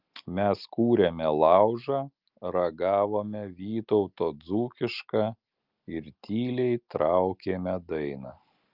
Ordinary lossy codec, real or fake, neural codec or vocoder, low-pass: Opus, 24 kbps; real; none; 5.4 kHz